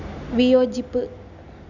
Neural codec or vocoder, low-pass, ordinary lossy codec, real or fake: none; 7.2 kHz; none; real